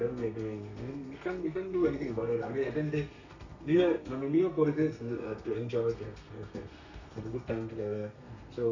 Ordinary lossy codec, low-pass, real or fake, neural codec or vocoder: none; 7.2 kHz; fake; codec, 32 kHz, 1.9 kbps, SNAC